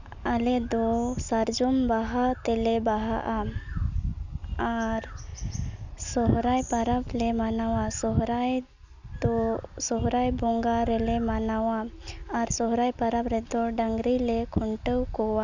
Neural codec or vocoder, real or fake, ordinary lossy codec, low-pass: none; real; none; 7.2 kHz